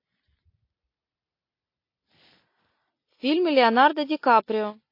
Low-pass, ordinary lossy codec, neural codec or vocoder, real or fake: 5.4 kHz; MP3, 32 kbps; none; real